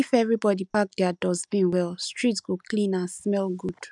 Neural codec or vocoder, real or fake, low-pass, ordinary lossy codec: none; real; 10.8 kHz; none